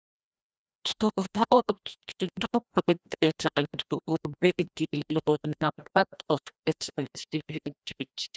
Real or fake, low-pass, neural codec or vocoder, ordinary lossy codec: fake; none; codec, 16 kHz, 1 kbps, FreqCodec, larger model; none